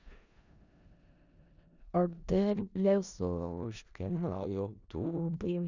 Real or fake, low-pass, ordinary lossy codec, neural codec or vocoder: fake; 7.2 kHz; none; codec, 16 kHz in and 24 kHz out, 0.4 kbps, LongCat-Audio-Codec, four codebook decoder